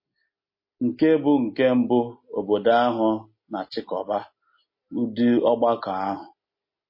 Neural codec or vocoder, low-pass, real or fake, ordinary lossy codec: none; 5.4 kHz; real; MP3, 24 kbps